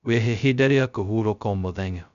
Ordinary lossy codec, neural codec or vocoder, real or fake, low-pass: none; codec, 16 kHz, 0.2 kbps, FocalCodec; fake; 7.2 kHz